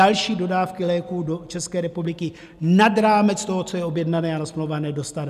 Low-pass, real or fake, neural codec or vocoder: 14.4 kHz; real; none